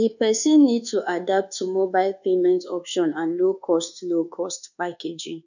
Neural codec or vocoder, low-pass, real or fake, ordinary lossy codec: codec, 24 kHz, 1.2 kbps, DualCodec; 7.2 kHz; fake; none